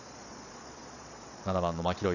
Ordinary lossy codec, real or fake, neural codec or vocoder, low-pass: none; fake; vocoder, 22.05 kHz, 80 mel bands, Vocos; 7.2 kHz